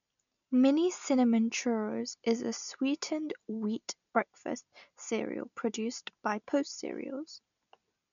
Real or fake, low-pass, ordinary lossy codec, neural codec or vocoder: real; 7.2 kHz; none; none